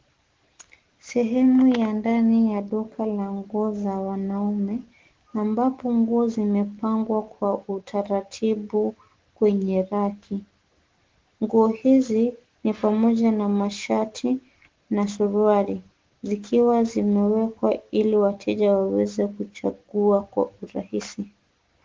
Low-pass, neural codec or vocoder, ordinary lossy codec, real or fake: 7.2 kHz; none; Opus, 16 kbps; real